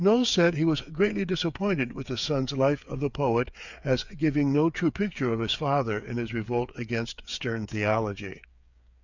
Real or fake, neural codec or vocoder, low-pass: fake; codec, 16 kHz, 8 kbps, FreqCodec, smaller model; 7.2 kHz